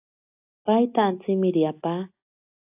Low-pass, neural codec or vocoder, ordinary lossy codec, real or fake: 3.6 kHz; none; AAC, 32 kbps; real